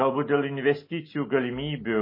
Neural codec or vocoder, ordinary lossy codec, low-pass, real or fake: none; MP3, 24 kbps; 5.4 kHz; real